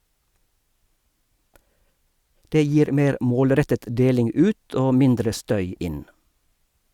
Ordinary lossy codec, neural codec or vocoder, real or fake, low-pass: Opus, 64 kbps; none; real; 19.8 kHz